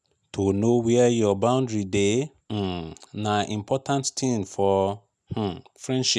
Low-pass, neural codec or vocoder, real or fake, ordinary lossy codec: none; none; real; none